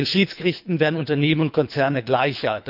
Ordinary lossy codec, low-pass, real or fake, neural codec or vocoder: none; 5.4 kHz; fake; codec, 24 kHz, 3 kbps, HILCodec